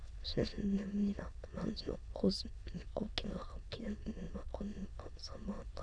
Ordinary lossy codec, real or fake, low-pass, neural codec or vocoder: none; fake; 9.9 kHz; autoencoder, 22.05 kHz, a latent of 192 numbers a frame, VITS, trained on many speakers